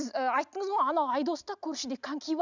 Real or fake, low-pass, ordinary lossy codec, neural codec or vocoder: real; 7.2 kHz; none; none